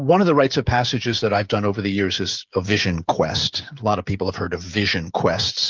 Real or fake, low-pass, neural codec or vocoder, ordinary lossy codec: fake; 7.2 kHz; codec, 16 kHz, 16 kbps, FreqCodec, smaller model; Opus, 24 kbps